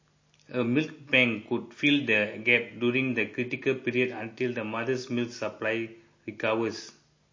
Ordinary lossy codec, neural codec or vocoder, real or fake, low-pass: MP3, 32 kbps; none; real; 7.2 kHz